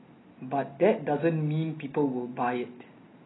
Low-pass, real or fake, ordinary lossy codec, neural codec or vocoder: 7.2 kHz; real; AAC, 16 kbps; none